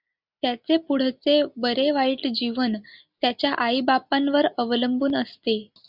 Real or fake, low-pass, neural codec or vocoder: real; 5.4 kHz; none